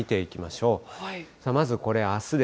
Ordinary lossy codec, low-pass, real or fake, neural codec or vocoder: none; none; real; none